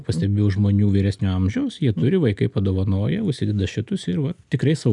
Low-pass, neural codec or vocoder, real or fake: 10.8 kHz; none; real